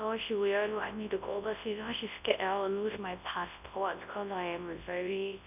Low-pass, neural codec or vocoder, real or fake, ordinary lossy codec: 3.6 kHz; codec, 24 kHz, 0.9 kbps, WavTokenizer, large speech release; fake; none